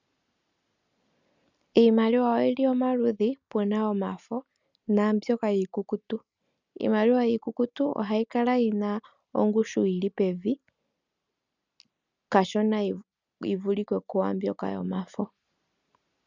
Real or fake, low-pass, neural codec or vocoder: real; 7.2 kHz; none